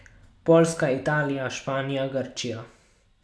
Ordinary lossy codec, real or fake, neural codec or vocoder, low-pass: none; real; none; none